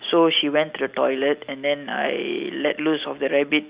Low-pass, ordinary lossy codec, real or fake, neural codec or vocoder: 3.6 kHz; Opus, 64 kbps; real; none